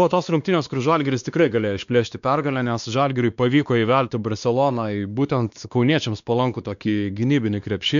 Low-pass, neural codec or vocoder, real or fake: 7.2 kHz; codec, 16 kHz, 2 kbps, X-Codec, WavLM features, trained on Multilingual LibriSpeech; fake